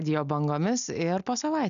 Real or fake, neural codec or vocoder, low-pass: real; none; 7.2 kHz